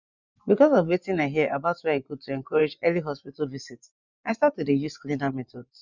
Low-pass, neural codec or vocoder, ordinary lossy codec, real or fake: 7.2 kHz; vocoder, 24 kHz, 100 mel bands, Vocos; none; fake